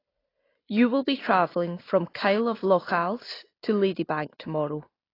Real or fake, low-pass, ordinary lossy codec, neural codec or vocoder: real; 5.4 kHz; AAC, 24 kbps; none